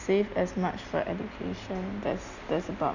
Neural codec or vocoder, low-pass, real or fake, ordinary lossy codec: none; 7.2 kHz; real; none